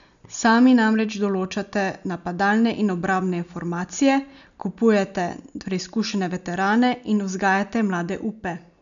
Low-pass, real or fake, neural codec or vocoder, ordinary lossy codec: 7.2 kHz; real; none; none